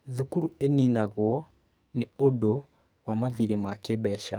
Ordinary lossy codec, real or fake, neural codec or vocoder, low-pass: none; fake; codec, 44.1 kHz, 2.6 kbps, SNAC; none